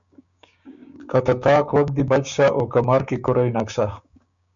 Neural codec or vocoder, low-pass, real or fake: codec, 16 kHz, 6 kbps, DAC; 7.2 kHz; fake